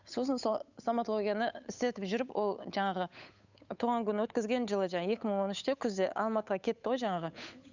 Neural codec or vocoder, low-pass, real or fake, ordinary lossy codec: codec, 16 kHz, 8 kbps, FunCodec, trained on Chinese and English, 25 frames a second; 7.2 kHz; fake; none